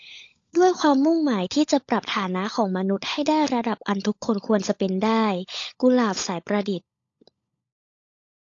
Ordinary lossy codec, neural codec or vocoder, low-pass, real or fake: AAC, 48 kbps; codec, 16 kHz, 16 kbps, FunCodec, trained on LibriTTS, 50 frames a second; 7.2 kHz; fake